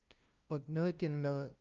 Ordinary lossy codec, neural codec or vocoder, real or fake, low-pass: Opus, 24 kbps; codec, 16 kHz, 0.5 kbps, FunCodec, trained on LibriTTS, 25 frames a second; fake; 7.2 kHz